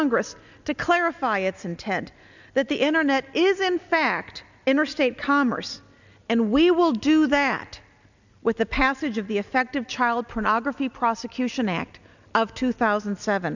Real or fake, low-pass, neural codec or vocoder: real; 7.2 kHz; none